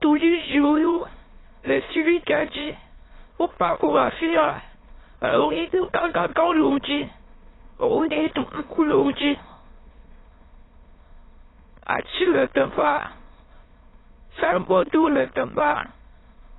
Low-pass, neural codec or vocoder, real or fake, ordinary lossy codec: 7.2 kHz; autoencoder, 22.05 kHz, a latent of 192 numbers a frame, VITS, trained on many speakers; fake; AAC, 16 kbps